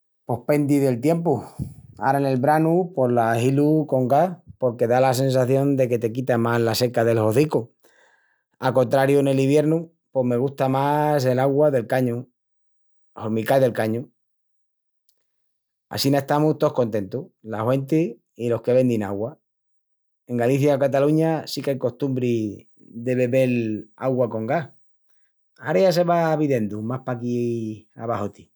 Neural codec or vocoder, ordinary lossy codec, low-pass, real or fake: none; none; none; real